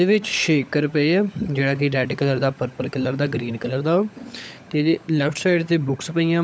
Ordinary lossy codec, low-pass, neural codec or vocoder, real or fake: none; none; codec, 16 kHz, 16 kbps, FunCodec, trained on LibriTTS, 50 frames a second; fake